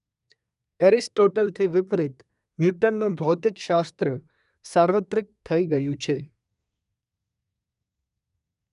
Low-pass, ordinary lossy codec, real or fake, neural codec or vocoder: 10.8 kHz; none; fake; codec, 24 kHz, 1 kbps, SNAC